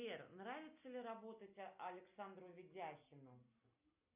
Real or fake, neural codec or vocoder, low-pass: real; none; 3.6 kHz